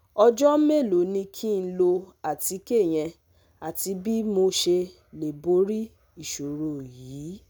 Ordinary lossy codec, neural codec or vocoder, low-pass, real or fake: none; none; none; real